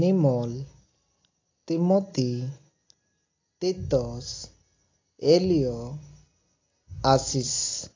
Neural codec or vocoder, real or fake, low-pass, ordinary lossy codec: none; real; 7.2 kHz; AAC, 32 kbps